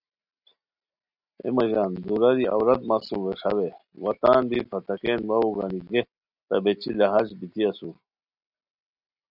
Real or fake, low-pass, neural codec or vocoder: real; 5.4 kHz; none